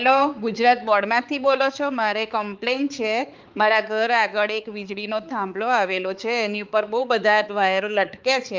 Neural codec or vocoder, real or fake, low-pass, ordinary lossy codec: codec, 16 kHz, 4 kbps, X-Codec, HuBERT features, trained on balanced general audio; fake; 7.2 kHz; Opus, 24 kbps